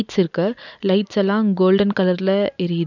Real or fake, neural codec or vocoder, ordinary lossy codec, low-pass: real; none; none; 7.2 kHz